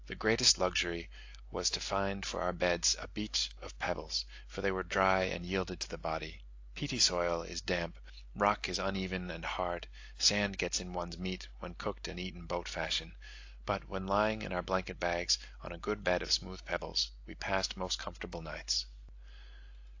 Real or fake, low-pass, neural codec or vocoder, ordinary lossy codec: real; 7.2 kHz; none; AAC, 48 kbps